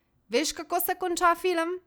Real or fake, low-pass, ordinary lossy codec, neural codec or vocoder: real; none; none; none